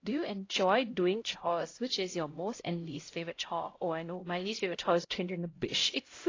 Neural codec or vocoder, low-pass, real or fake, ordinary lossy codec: codec, 16 kHz, 0.5 kbps, X-Codec, HuBERT features, trained on LibriSpeech; 7.2 kHz; fake; AAC, 32 kbps